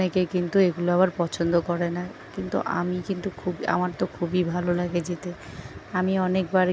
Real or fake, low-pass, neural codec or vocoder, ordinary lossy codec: real; none; none; none